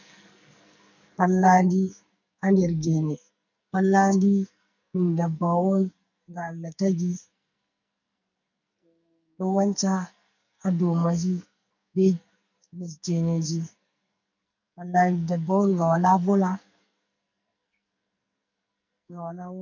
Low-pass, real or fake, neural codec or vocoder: 7.2 kHz; fake; codec, 44.1 kHz, 2.6 kbps, SNAC